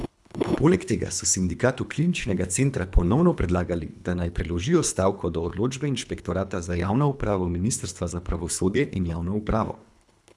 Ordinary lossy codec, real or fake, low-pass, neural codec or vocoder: none; fake; none; codec, 24 kHz, 3 kbps, HILCodec